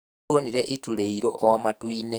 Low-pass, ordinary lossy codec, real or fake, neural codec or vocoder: none; none; fake; codec, 44.1 kHz, 2.6 kbps, SNAC